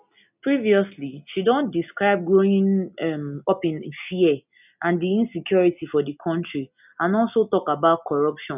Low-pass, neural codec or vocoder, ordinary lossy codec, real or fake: 3.6 kHz; none; none; real